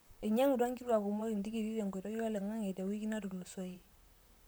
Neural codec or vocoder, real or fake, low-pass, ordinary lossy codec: vocoder, 44.1 kHz, 128 mel bands, Pupu-Vocoder; fake; none; none